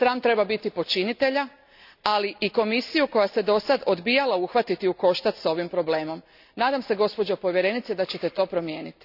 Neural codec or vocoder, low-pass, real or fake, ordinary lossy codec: none; 5.4 kHz; real; none